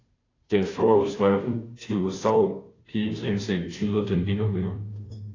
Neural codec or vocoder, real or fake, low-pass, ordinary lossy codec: codec, 16 kHz, 0.5 kbps, FunCodec, trained on Chinese and English, 25 frames a second; fake; 7.2 kHz; AAC, 32 kbps